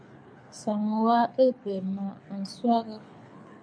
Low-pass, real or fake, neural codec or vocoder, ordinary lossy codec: 9.9 kHz; fake; codec, 24 kHz, 6 kbps, HILCodec; MP3, 48 kbps